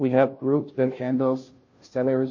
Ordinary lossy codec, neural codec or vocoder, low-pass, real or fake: MP3, 32 kbps; codec, 16 kHz, 0.5 kbps, FunCodec, trained on Chinese and English, 25 frames a second; 7.2 kHz; fake